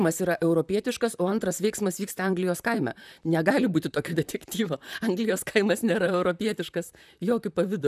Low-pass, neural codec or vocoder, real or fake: 14.4 kHz; vocoder, 44.1 kHz, 128 mel bands, Pupu-Vocoder; fake